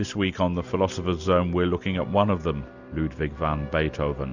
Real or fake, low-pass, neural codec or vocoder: real; 7.2 kHz; none